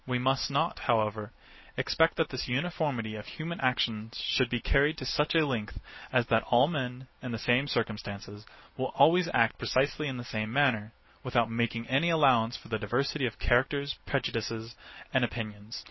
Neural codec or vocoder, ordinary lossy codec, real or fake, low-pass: none; MP3, 24 kbps; real; 7.2 kHz